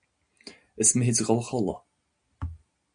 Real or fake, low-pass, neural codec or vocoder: real; 9.9 kHz; none